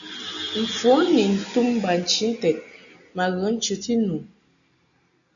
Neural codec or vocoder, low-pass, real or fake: none; 7.2 kHz; real